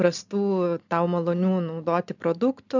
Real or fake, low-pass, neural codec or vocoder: real; 7.2 kHz; none